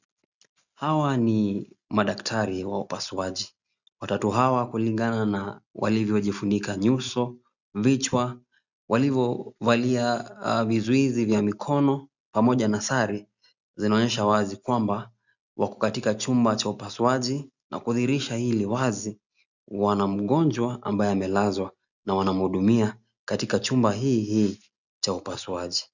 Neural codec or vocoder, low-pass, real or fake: none; 7.2 kHz; real